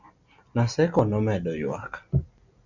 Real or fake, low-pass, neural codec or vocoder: real; 7.2 kHz; none